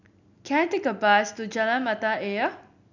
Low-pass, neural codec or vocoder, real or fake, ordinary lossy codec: 7.2 kHz; none; real; none